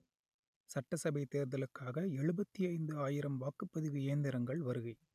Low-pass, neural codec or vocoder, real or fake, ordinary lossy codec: 14.4 kHz; none; real; none